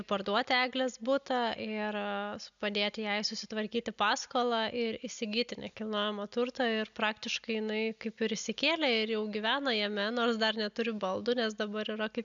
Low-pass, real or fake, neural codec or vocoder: 7.2 kHz; real; none